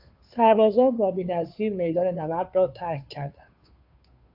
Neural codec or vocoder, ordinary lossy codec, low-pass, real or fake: codec, 16 kHz, 2 kbps, FunCodec, trained on Chinese and English, 25 frames a second; AAC, 48 kbps; 5.4 kHz; fake